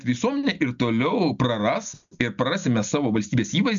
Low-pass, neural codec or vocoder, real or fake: 7.2 kHz; none; real